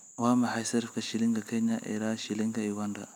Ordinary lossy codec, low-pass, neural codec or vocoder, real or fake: none; 19.8 kHz; none; real